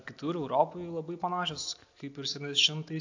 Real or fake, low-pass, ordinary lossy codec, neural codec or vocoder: real; 7.2 kHz; AAC, 48 kbps; none